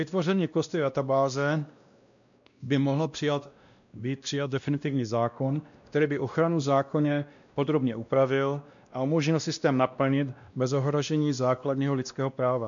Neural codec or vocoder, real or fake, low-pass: codec, 16 kHz, 1 kbps, X-Codec, WavLM features, trained on Multilingual LibriSpeech; fake; 7.2 kHz